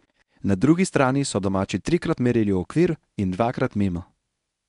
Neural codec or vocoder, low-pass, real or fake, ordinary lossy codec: codec, 24 kHz, 0.9 kbps, WavTokenizer, medium speech release version 1; 10.8 kHz; fake; none